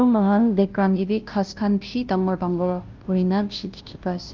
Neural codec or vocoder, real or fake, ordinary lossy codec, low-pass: codec, 16 kHz, 0.5 kbps, FunCodec, trained on Chinese and English, 25 frames a second; fake; Opus, 32 kbps; 7.2 kHz